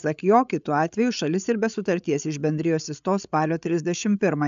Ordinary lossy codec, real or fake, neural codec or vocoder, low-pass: AAC, 96 kbps; fake; codec, 16 kHz, 8 kbps, FreqCodec, larger model; 7.2 kHz